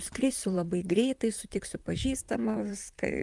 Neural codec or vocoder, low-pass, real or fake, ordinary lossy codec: vocoder, 44.1 kHz, 128 mel bands, Pupu-Vocoder; 10.8 kHz; fake; Opus, 32 kbps